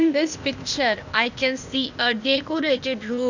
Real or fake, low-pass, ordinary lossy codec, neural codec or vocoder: fake; 7.2 kHz; none; codec, 16 kHz, 0.8 kbps, ZipCodec